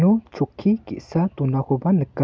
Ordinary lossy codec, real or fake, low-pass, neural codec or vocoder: none; real; none; none